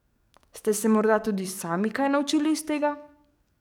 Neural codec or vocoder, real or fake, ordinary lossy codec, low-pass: codec, 44.1 kHz, 7.8 kbps, DAC; fake; none; 19.8 kHz